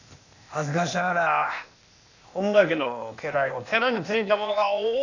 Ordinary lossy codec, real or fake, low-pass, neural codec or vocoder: none; fake; 7.2 kHz; codec, 16 kHz, 0.8 kbps, ZipCodec